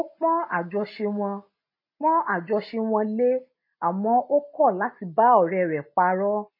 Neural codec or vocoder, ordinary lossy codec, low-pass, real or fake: none; MP3, 24 kbps; 5.4 kHz; real